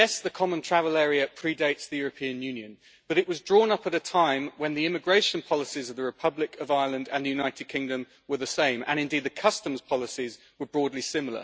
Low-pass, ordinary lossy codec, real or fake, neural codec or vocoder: none; none; real; none